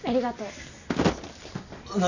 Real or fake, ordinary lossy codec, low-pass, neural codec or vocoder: real; none; 7.2 kHz; none